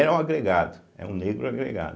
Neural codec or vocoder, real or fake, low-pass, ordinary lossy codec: none; real; none; none